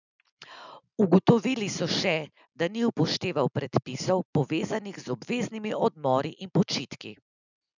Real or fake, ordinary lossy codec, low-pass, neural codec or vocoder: real; none; 7.2 kHz; none